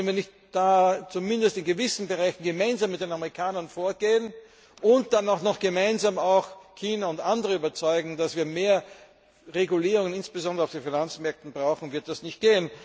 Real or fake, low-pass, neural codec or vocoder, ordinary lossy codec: real; none; none; none